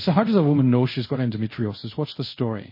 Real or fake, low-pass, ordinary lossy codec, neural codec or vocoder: fake; 5.4 kHz; MP3, 24 kbps; codec, 24 kHz, 0.5 kbps, DualCodec